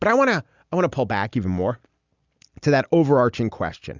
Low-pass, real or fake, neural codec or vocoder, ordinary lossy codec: 7.2 kHz; real; none; Opus, 64 kbps